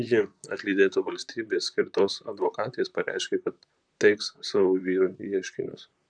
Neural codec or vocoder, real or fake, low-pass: vocoder, 44.1 kHz, 128 mel bands, Pupu-Vocoder; fake; 9.9 kHz